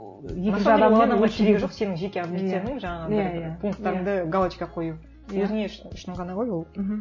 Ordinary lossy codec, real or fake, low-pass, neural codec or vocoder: MP3, 32 kbps; real; 7.2 kHz; none